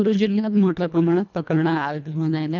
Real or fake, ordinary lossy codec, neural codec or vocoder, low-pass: fake; none; codec, 24 kHz, 1.5 kbps, HILCodec; 7.2 kHz